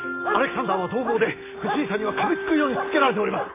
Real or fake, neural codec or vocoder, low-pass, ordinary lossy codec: real; none; 3.6 kHz; AAC, 16 kbps